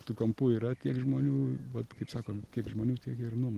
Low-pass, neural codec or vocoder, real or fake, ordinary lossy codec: 14.4 kHz; none; real; Opus, 16 kbps